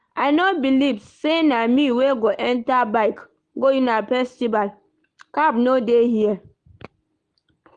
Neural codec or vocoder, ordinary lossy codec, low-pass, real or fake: none; Opus, 24 kbps; 9.9 kHz; real